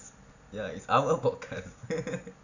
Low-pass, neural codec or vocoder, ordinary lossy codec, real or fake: 7.2 kHz; none; none; real